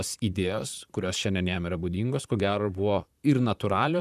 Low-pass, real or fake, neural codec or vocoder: 14.4 kHz; fake; vocoder, 44.1 kHz, 128 mel bands, Pupu-Vocoder